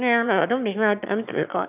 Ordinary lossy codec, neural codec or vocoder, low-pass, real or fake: none; autoencoder, 22.05 kHz, a latent of 192 numbers a frame, VITS, trained on one speaker; 3.6 kHz; fake